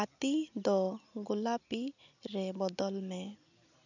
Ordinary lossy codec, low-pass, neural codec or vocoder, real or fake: none; 7.2 kHz; none; real